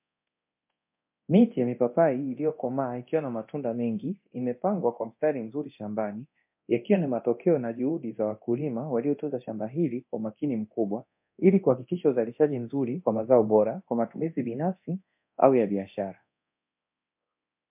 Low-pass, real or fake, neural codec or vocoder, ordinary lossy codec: 3.6 kHz; fake; codec, 24 kHz, 0.9 kbps, DualCodec; MP3, 32 kbps